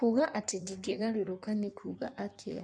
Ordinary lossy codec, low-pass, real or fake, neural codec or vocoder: none; 9.9 kHz; fake; codec, 16 kHz in and 24 kHz out, 1.1 kbps, FireRedTTS-2 codec